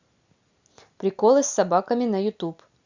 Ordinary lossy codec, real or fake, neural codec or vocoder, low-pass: Opus, 64 kbps; real; none; 7.2 kHz